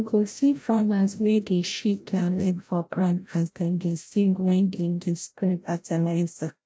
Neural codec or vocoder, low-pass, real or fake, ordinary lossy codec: codec, 16 kHz, 0.5 kbps, FreqCodec, larger model; none; fake; none